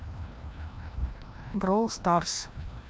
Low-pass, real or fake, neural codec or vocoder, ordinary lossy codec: none; fake; codec, 16 kHz, 1 kbps, FreqCodec, larger model; none